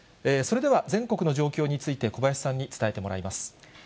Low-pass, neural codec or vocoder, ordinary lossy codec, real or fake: none; none; none; real